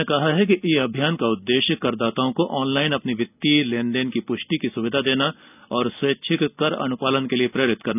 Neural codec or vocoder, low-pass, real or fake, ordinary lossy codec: none; 3.6 kHz; real; none